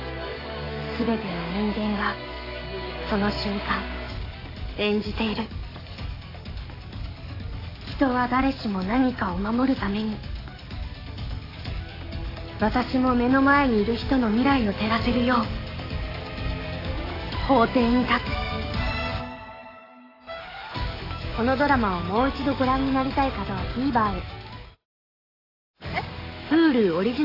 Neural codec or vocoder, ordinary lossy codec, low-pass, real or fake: codec, 44.1 kHz, 7.8 kbps, DAC; AAC, 24 kbps; 5.4 kHz; fake